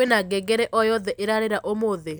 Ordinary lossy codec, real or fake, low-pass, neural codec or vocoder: none; real; none; none